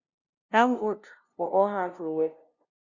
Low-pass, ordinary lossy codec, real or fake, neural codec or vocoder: 7.2 kHz; Opus, 64 kbps; fake; codec, 16 kHz, 0.5 kbps, FunCodec, trained on LibriTTS, 25 frames a second